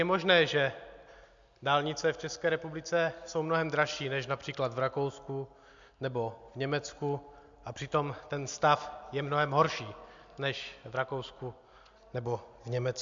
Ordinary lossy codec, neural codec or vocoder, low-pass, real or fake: MP3, 64 kbps; none; 7.2 kHz; real